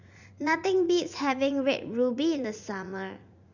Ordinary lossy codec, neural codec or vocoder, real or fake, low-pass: none; vocoder, 44.1 kHz, 80 mel bands, Vocos; fake; 7.2 kHz